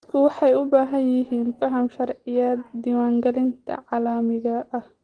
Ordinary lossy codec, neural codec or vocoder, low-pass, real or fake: Opus, 16 kbps; none; 9.9 kHz; real